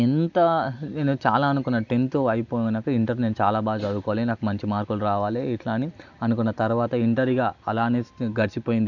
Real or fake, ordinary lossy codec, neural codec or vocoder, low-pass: real; none; none; 7.2 kHz